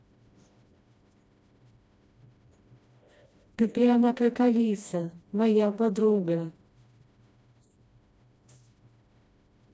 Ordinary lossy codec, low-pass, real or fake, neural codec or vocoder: none; none; fake; codec, 16 kHz, 1 kbps, FreqCodec, smaller model